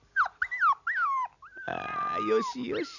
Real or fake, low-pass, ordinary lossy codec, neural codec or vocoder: real; 7.2 kHz; none; none